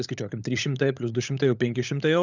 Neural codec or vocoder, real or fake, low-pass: codec, 16 kHz, 16 kbps, FunCodec, trained on LibriTTS, 50 frames a second; fake; 7.2 kHz